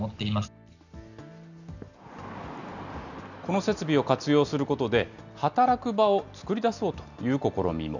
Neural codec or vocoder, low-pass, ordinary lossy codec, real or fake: none; 7.2 kHz; none; real